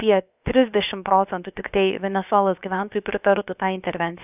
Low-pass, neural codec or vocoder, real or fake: 3.6 kHz; codec, 16 kHz, about 1 kbps, DyCAST, with the encoder's durations; fake